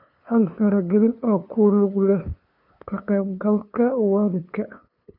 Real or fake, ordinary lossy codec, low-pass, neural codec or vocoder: fake; none; 5.4 kHz; codec, 16 kHz, 2 kbps, FunCodec, trained on LibriTTS, 25 frames a second